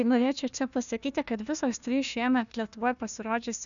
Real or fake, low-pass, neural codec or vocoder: fake; 7.2 kHz; codec, 16 kHz, 1 kbps, FunCodec, trained on Chinese and English, 50 frames a second